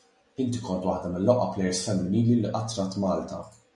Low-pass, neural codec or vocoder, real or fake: 10.8 kHz; none; real